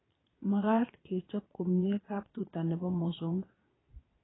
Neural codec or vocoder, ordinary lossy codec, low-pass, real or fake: vocoder, 22.05 kHz, 80 mel bands, Vocos; AAC, 16 kbps; 7.2 kHz; fake